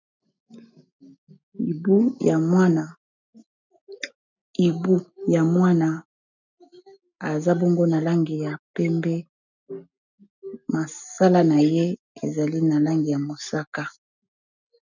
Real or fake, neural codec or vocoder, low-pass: real; none; 7.2 kHz